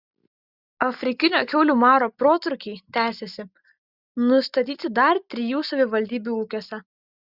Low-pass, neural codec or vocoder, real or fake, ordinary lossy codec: 5.4 kHz; none; real; Opus, 64 kbps